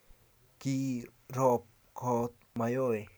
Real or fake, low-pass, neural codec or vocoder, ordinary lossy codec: real; none; none; none